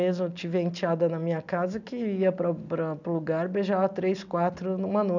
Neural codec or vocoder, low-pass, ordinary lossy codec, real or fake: none; 7.2 kHz; none; real